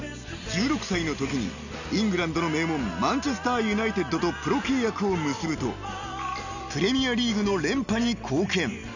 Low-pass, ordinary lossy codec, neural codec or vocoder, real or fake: 7.2 kHz; none; none; real